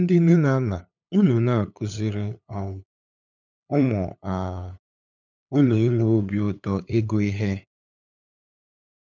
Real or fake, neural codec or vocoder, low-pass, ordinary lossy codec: fake; codec, 16 kHz, 8 kbps, FunCodec, trained on LibriTTS, 25 frames a second; 7.2 kHz; none